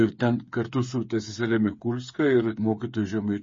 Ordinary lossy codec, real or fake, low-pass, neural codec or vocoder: MP3, 32 kbps; fake; 7.2 kHz; codec, 16 kHz, 8 kbps, FreqCodec, smaller model